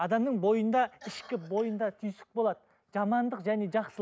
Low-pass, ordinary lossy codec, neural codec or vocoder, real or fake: none; none; none; real